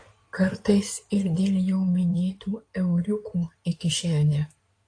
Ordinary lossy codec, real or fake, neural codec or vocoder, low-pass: AAC, 48 kbps; fake; codec, 16 kHz in and 24 kHz out, 2.2 kbps, FireRedTTS-2 codec; 9.9 kHz